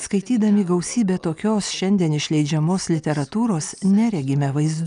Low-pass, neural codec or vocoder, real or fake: 9.9 kHz; none; real